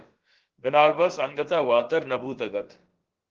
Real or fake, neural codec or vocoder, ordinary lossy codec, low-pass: fake; codec, 16 kHz, about 1 kbps, DyCAST, with the encoder's durations; Opus, 16 kbps; 7.2 kHz